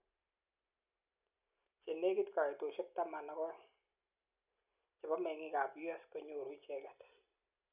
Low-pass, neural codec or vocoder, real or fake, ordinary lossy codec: 3.6 kHz; none; real; none